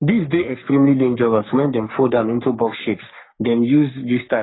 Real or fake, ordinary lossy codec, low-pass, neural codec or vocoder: fake; AAC, 16 kbps; 7.2 kHz; codec, 44.1 kHz, 3.4 kbps, Pupu-Codec